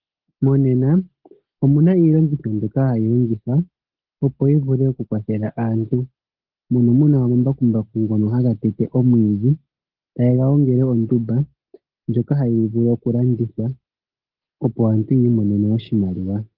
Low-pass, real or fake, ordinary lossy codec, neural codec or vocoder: 5.4 kHz; real; Opus, 16 kbps; none